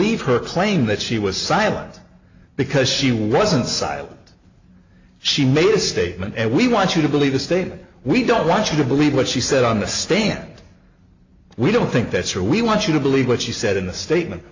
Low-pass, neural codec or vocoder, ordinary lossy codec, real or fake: 7.2 kHz; none; AAC, 48 kbps; real